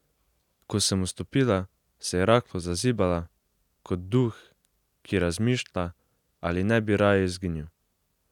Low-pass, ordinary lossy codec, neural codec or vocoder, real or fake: 19.8 kHz; none; none; real